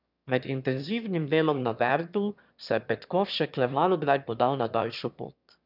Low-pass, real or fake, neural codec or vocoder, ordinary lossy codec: 5.4 kHz; fake; autoencoder, 22.05 kHz, a latent of 192 numbers a frame, VITS, trained on one speaker; none